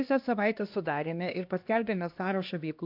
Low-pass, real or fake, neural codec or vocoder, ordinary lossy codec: 5.4 kHz; fake; codec, 16 kHz, 1 kbps, X-Codec, HuBERT features, trained on LibriSpeech; MP3, 48 kbps